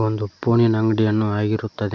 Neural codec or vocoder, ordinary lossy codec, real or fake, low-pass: none; none; real; none